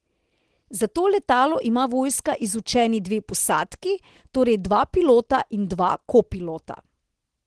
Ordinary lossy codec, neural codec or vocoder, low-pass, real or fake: Opus, 16 kbps; none; 10.8 kHz; real